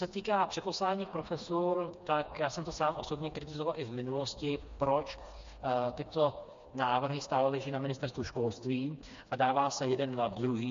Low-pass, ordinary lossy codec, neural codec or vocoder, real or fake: 7.2 kHz; MP3, 48 kbps; codec, 16 kHz, 2 kbps, FreqCodec, smaller model; fake